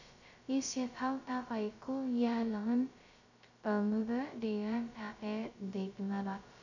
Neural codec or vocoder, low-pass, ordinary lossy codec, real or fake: codec, 16 kHz, 0.2 kbps, FocalCodec; 7.2 kHz; none; fake